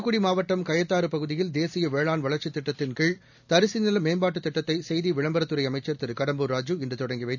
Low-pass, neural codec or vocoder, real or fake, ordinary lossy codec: 7.2 kHz; none; real; none